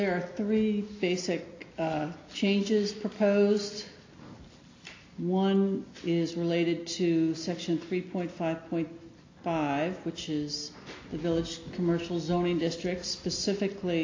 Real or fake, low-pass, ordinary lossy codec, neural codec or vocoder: real; 7.2 kHz; AAC, 32 kbps; none